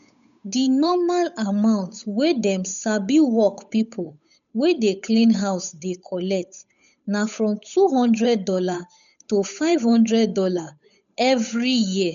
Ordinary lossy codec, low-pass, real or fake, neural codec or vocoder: none; 7.2 kHz; fake; codec, 16 kHz, 8 kbps, FunCodec, trained on Chinese and English, 25 frames a second